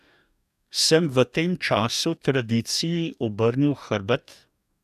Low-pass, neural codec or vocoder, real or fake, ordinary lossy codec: 14.4 kHz; codec, 44.1 kHz, 2.6 kbps, DAC; fake; none